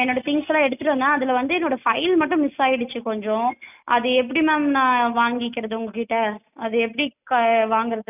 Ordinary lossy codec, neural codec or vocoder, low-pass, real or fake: none; none; 3.6 kHz; real